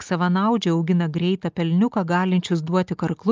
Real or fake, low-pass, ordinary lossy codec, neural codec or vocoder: real; 7.2 kHz; Opus, 24 kbps; none